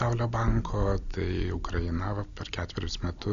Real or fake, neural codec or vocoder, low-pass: real; none; 7.2 kHz